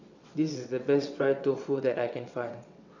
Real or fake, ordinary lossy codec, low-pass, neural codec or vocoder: fake; none; 7.2 kHz; vocoder, 22.05 kHz, 80 mel bands, WaveNeXt